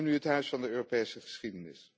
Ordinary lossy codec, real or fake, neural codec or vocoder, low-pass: none; real; none; none